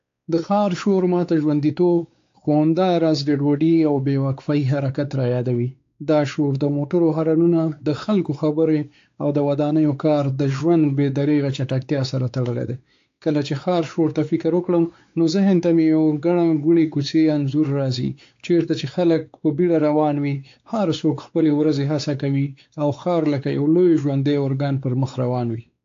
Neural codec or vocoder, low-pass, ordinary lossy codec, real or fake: codec, 16 kHz, 4 kbps, X-Codec, WavLM features, trained on Multilingual LibriSpeech; 7.2 kHz; AAC, 48 kbps; fake